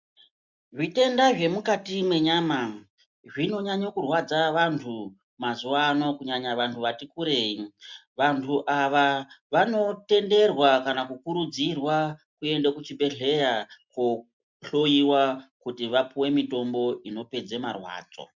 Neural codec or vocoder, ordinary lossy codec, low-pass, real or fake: none; MP3, 64 kbps; 7.2 kHz; real